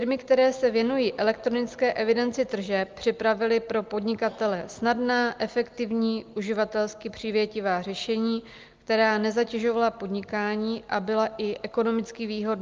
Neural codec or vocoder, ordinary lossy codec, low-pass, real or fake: none; Opus, 32 kbps; 7.2 kHz; real